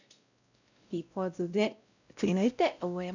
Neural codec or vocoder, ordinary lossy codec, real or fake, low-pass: codec, 16 kHz, 0.5 kbps, X-Codec, WavLM features, trained on Multilingual LibriSpeech; none; fake; 7.2 kHz